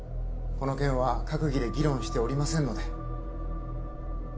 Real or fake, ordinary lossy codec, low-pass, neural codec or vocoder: real; none; none; none